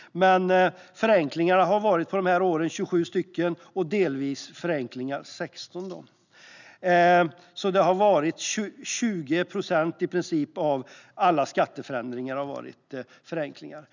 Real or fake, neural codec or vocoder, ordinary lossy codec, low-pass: real; none; none; 7.2 kHz